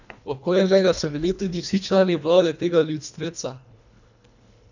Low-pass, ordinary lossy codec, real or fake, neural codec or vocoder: 7.2 kHz; none; fake; codec, 24 kHz, 1.5 kbps, HILCodec